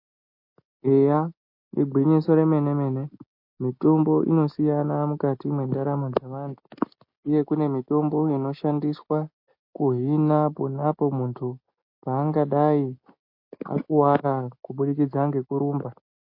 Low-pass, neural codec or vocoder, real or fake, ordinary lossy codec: 5.4 kHz; none; real; MP3, 32 kbps